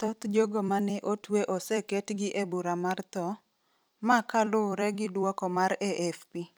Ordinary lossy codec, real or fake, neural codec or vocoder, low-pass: none; fake; vocoder, 44.1 kHz, 128 mel bands every 256 samples, BigVGAN v2; none